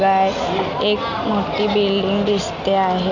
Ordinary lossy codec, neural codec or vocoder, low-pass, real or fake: none; none; 7.2 kHz; real